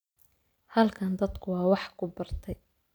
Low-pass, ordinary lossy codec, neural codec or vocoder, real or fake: none; none; none; real